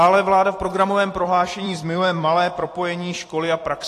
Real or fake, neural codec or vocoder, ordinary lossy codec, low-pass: fake; vocoder, 44.1 kHz, 128 mel bands every 256 samples, BigVGAN v2; AAC, 48 kbps; 14.4 kHz